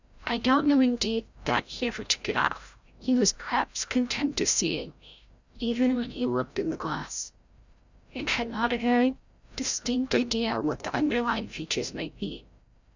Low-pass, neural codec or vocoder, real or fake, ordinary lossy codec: 7.2 kHz; codec, 16 kHz, 0.5 kbps, FreqCodec, larger model; fake; Opus, 64 kbps